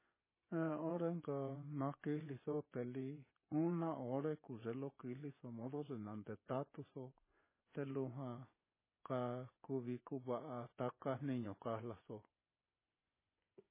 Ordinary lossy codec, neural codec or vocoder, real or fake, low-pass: MP3, 16 kbps; vocoder, 24 kHz, 100 mel bands, Vocos; fake; 3.6 kHz